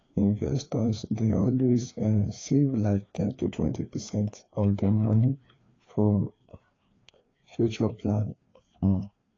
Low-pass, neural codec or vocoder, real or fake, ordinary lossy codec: 7.2 kHz; codec, 16 kHz, 2 kbps, FreqCodec, larger model; fake; AAC, 32 kbps